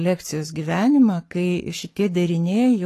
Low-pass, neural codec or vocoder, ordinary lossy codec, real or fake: 14.4 kHz; codec, 44.1 kHz, 7.8 kbps, Pupu-Codec; AAC, 48 kbps; fake